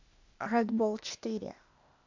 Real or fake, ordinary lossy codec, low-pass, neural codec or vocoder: fake; MP3, 64 kbps; 7.2 kHz; codec, 16 kHz, 0.8 kbps, ZipCodec